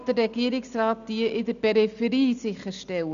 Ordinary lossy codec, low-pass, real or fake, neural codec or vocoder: none; 7.2 kHz; real; none